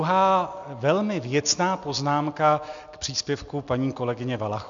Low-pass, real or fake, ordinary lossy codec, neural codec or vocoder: 7.2 kHz; real; MP3, 64 kbps; none